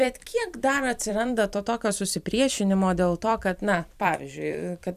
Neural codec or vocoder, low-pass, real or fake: vocoder, 44.1 kHz, 128 mel bands every 256 samples, BigVGAN v2; 14.4 kHz; fake